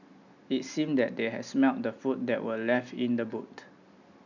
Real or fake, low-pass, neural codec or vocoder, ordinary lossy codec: real; 7.2 kHz; none; none